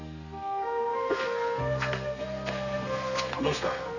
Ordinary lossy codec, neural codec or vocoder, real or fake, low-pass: none; codec, 32 kHz, 1.9 kbps, SNAC; fake; 7.2 kHz